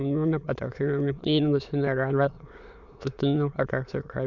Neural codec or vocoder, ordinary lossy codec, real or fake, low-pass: autoencoder, 22.05 kHz, a latent of 192 numbers a frame, VITS, trained on many speakers; none; fake; 7.2 kHz